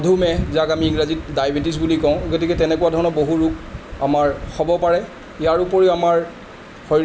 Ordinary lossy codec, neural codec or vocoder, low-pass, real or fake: none; none; none; real